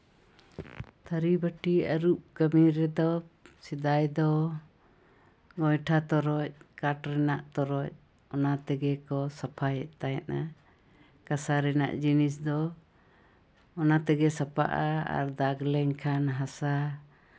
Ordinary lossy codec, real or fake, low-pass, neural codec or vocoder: none; real; none; none